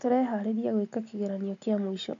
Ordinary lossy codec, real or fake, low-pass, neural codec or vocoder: MP3, 64 kbps; real; 7.2 kHz; none